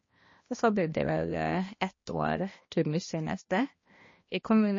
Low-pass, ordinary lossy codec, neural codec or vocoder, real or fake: 7.2 kHz; MP3, 32 kbps; codec, 16 kHz, 1 kbps, X-Codec, HuBERT features, trained on balanced general audio; fake